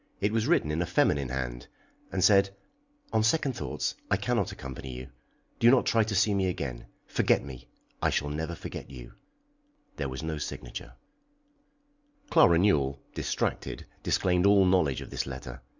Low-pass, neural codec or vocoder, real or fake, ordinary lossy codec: 7.2 kHz; none; real; Opus, 64 kbps